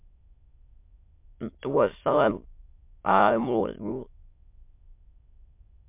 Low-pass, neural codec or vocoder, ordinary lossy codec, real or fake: 3.6 kHz; autoencoder, 22.05 kHz, a latent of 192 numbers a frame, VITS, trained on many speakers; MP3, 32 kbps; fake